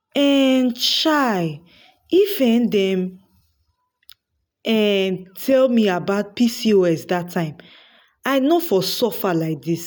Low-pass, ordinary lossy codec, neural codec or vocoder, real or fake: none; none; none; real